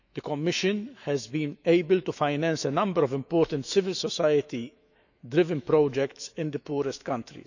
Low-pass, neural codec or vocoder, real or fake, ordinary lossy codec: 7.2 kHz; autoencoder, 48 kHz, 128 numbers a frame, DAC-VAE, trained on Japanese speech; fake; none